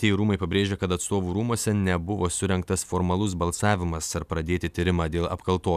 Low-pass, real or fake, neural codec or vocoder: 14.4 kHz; real; none